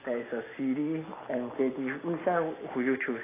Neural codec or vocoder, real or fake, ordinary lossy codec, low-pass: none; real; AAC, 16 kbps; 3.6 kHz